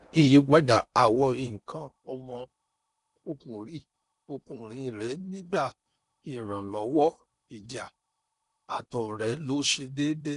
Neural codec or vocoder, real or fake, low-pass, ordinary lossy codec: codec, 16 kHz in and 24 kHz out, 0.8 kbps, FocalCodec, streaming, 65536 codes; fake; 10.8 kHz; none